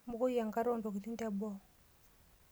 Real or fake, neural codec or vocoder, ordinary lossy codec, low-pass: real; none; none; none